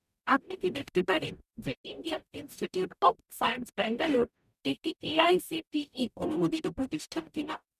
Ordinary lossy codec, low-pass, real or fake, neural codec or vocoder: none; 14.4 kHz; fake; codec, 44.1 kHz, 0.9 kbps, DAC